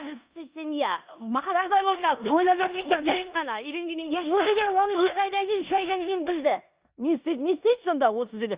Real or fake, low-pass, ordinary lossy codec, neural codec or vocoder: fake; 3.6 kHz; Opus, 64 kbps; codec, 16 kHz in and 24 kHz out, 0.9 kbps, LongCat-Audio-Codec, four codebook decoder